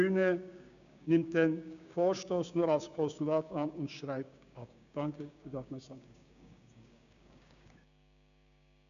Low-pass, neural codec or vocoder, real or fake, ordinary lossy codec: 7.2 kHz; codec, 16 kHz, 6 kbps, DAC; fake; none